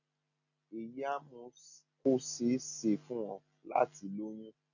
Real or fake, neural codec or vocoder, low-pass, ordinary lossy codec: real; none; 7.2 kHz; none